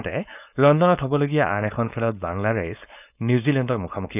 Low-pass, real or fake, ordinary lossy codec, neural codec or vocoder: 3.6 kHz; fake; none; codec, 16 kHz, 4.8 kbps, FACodec